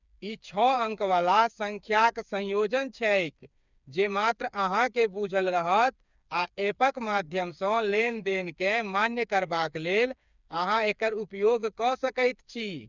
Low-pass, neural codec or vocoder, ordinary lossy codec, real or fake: 7.2 kHz; codec, 16 kHz, 4 kbps, FreqCodec, smaller model; none; fake